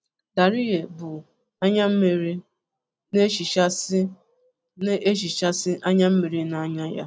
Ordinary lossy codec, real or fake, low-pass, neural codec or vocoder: none; real; none; none